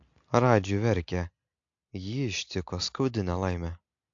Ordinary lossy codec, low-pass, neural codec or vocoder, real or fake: AAC, 64 kbps; 7.2 kHz; none; real